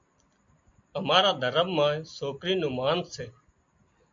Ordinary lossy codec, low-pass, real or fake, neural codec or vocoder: MP3, 48 kbps; 7.2 kHz; real; none